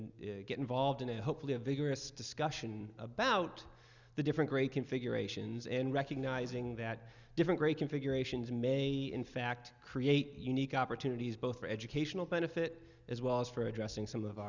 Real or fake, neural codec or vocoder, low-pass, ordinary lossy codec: real; none; 7.2 kHz; Opus, 64 kbps